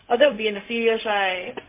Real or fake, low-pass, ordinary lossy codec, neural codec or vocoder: fake; 3.6 kHz; MP3, 24 kbps; codec, 16 kHz, 0.4 kbps, LongCat-Audio-Codec